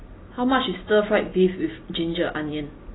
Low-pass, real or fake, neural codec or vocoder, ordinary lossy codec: 7.2 kHz; real; none; AAC, 16 kbps